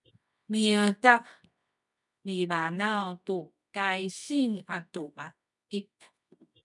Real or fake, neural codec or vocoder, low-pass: fake; codec, 24 kHz, 0.9 kbps, WavTokenizer, medium music audio release; 10.8 kHz